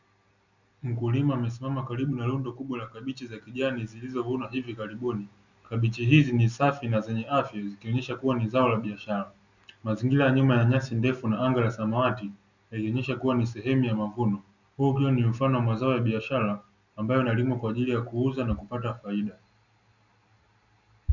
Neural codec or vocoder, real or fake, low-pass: none; real; 7.2 kHz